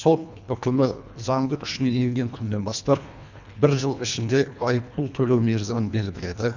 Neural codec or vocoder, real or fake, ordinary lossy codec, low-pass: codec, 24 kHz, 1.5 kbps, HILCodec; fake; none; 7.2 kHz